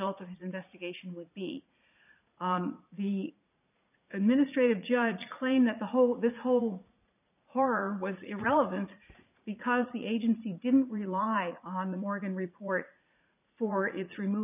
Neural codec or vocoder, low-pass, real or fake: vocoder, 44.1 kHz, 80 mel bands, Vocos; 3.6 kHz; fake